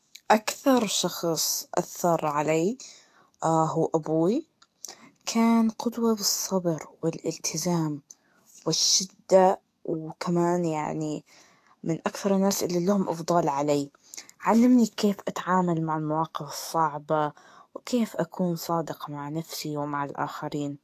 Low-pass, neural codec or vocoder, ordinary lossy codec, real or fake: 14.4 kHz; codec, 44.1 kHz, 7.8 kbps, DAC; AAC, 64 kbps; fake